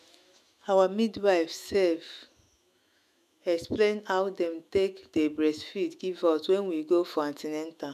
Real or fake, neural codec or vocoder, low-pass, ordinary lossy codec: fake; autoencoder, 48 kHz, 128 numbers a frame, DAC-VAE, trained on Japanese speech; 14.4 kHz; MP3, 96 kbps